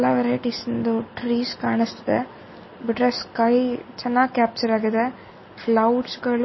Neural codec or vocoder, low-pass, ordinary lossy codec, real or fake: none; 7.2 kHz; MP3, 24 kbps; real